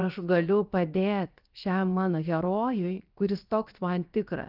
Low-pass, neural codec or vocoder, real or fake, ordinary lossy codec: 5.4 kHz; codec, 16 kHz, 0.7 kbps, FocalCodec; fake; Opus, 24 kbps